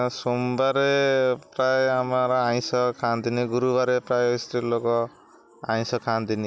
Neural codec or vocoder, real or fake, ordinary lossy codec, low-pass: none; real; none; none